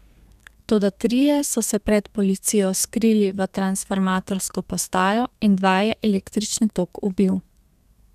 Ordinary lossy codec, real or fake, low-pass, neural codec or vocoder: none; fake; 14.4 kHz; codec, 32 kHz, 1.9 kbps, SNAC